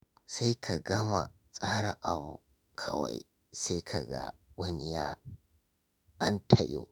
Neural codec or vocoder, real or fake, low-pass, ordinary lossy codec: autoencoder, 48 kHz, 32 numbers a frame, DAC-VAE, trained on Japanese speech; fake; none; none